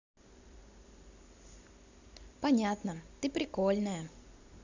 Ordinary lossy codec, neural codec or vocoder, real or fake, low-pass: Opus, 64 kbps; none; real; 7.2 kHz